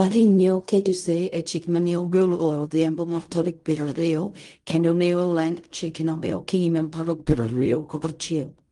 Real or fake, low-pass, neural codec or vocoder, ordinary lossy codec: fake; 10.8 kHz; codec, 16 kHz in and 24 kHz out, 0.4 kbps, LongCat-Audio-Codec, fine tuned four codebook decoder; Opus, 32 kbps